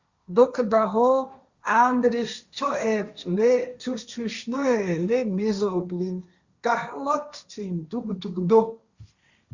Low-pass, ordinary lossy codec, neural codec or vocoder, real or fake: 7.2 kHz; Opus, 64 kbps; codec, 16 kHz, 1.1 kbps, Voila-Tokenizer; fake